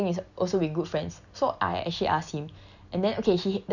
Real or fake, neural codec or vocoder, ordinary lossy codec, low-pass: real; none; none; 7.2 kHz